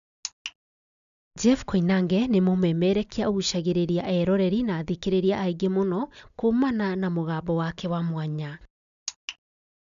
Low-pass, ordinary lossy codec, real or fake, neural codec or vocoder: 7.2 kHz; none; real; none